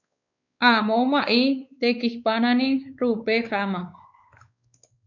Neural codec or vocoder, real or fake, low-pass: codec, 16 kHz, 4 kbps, X-Codec, WavLM features, trained on Multilingual LibriSpeech; fake; 7.2 kHz